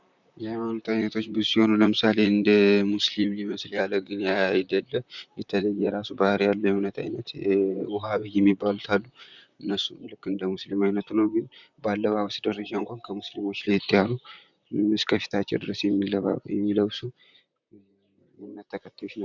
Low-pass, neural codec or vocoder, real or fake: 7.2 kHz; vocoder, 44.1 kHz, 128 mel bands, Pupu-Vocoder; fake